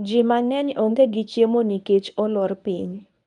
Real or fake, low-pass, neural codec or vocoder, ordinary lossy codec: fake; 10.8 kHz; codec, 24 kHz, 0.9 kbps, WavTokenizer, medium speech release version 1; none